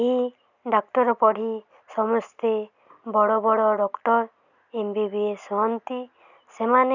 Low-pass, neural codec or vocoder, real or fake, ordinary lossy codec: 7.2 kHz; none; real; none